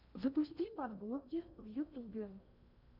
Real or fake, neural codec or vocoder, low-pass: fake; codec, 16 kHz in and 24 kHz out, 0.8 kbps, FocalCodec, streaming, 65536 codes; 5.4 kHz